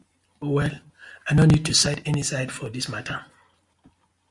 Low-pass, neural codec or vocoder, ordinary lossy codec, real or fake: 10.8 kHz; none; Opus, 64 kbps; real